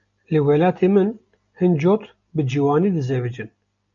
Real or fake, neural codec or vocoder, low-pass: real; none; 7.2 kHz